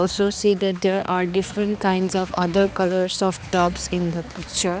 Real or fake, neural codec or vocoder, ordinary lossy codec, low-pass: fake; codec, 16 kHz, 2 kbps, X-Codec, HuBERT features, trained on balanced general audio; none; none